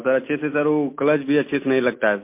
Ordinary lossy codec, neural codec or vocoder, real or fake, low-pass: MP3, 24 kbps; none; real; 3.6 kHz